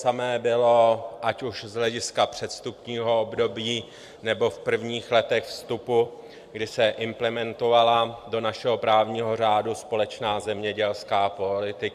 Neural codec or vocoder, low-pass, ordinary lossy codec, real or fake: vocoder, 44.1 kHz, 128 mel bands every 256 samples, BigVGAN v2; 14.4 kHz; AAC, 96 kbps; fake